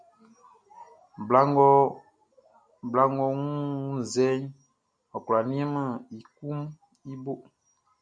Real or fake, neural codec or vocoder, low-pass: real; none; 9.9 kHz